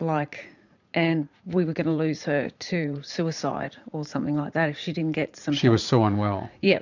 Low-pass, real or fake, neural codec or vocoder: 7.2 kHz; fake; vocoder, 44.1 kHz, 80 mel bands, Vocos